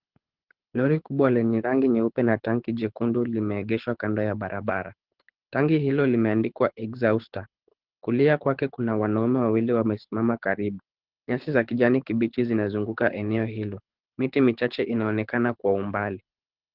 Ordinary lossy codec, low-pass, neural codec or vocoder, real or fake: Opus, 16 kbps; 5.4 kHz; codec, 24 kHz, 6 kbps, HILCodec; fake